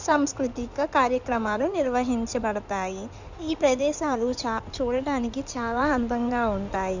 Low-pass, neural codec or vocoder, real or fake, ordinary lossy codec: 7.2 kHz; codec, 16 kHz in and 24 kHz out, 2.2 kbps, FireRedTTS-2 codec; fake; none